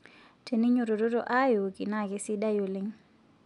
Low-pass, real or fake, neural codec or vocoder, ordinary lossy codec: 10.8 kHz; real; none; none